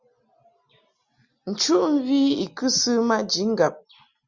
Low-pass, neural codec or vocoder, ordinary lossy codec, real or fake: 7.2 kHz; none; Opus, 64 kbps; real